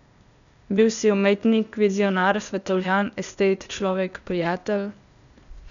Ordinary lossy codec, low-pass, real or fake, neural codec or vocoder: none; 7.2 kHz; fake; codec, 16 kHz, 0.8 kbps, ZipCodec